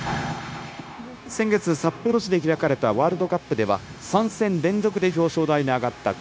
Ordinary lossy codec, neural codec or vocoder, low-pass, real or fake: none; codec, 16 kHz, 0.9 kbps, LongCat-Audio-Codec; none; fake